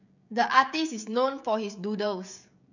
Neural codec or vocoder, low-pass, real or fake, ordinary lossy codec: codec, 16 kHz, 16 kbps, FreqCodec, smaller model; 7.2 kHz; fake; none